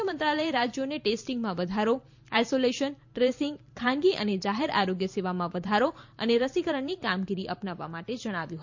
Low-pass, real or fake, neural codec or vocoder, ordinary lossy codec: 7.2 kHz; fake; vocoder, 44.1 kHz, 128 mel bands every 512 samples, BigVGAN v2; MP3, 48 kbps